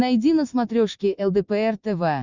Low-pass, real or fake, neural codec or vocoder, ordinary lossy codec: 7.2 kHz; real; none; Opus, 64 kbps